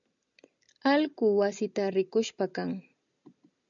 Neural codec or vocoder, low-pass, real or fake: none; 7.2 kHz; real